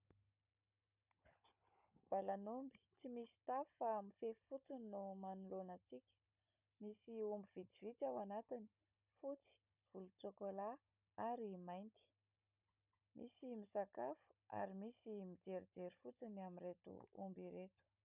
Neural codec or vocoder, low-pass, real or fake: codec, 16 kHz, 16 kbps, FunCodec, trained on Chinese and English, 50 frames a second; 3.6 kHz; fake